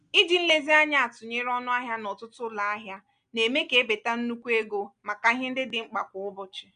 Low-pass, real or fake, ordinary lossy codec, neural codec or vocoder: 9.9 kHz; real; none; none